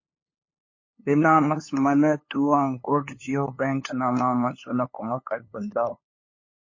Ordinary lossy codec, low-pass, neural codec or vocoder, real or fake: MP3, 32 kbps; 7.2 kHz; codec, 16 kHz, 2 kbps, FunCodec, trained on LibriTTS, 25 frames a second; fake